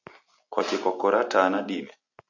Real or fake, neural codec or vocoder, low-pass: real; none; 7.2 kHz